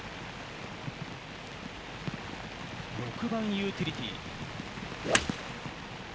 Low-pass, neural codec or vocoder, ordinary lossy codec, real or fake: none; none; none; real